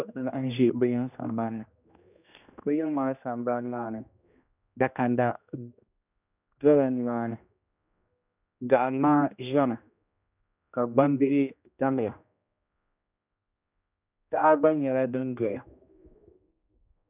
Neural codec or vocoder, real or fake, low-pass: codec, 16 kHz, 1 kbps, X-Codec, HuBERT features, trained on general audio; fake; 3.6 kHz